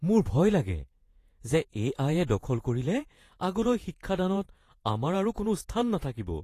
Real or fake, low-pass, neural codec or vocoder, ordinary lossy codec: fake; 14.4 kHz; vocoder, 48 kHz, 128 mel bands, Vocos; AAC, 48 kbps